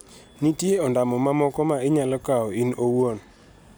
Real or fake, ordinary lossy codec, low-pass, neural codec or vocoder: real; none; none; none